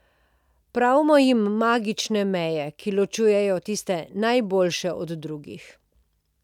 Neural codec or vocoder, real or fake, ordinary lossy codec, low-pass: none; real; none; 19.8 kHz